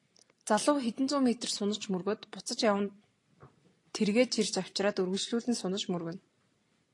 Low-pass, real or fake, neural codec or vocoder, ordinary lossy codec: 10.8 kHz; real; none; AAC, 48 kbps